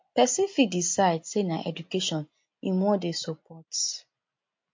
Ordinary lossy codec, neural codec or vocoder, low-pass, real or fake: MP3, 48 kbps; none; 7.2 kHz; real